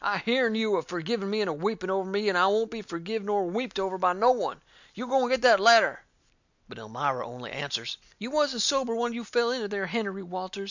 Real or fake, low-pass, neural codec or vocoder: real; 7.2 kHz; none